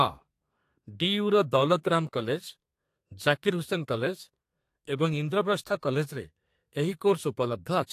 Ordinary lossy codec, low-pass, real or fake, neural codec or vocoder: AAC, 64 kbps; 14.4 kHz; fake; codec, 32 kHz, 1.9 kbps, SNAC